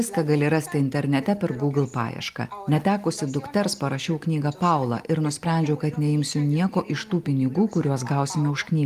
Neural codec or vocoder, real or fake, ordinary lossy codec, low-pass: none; real; Opus, 32 kbps; 14.4 kHz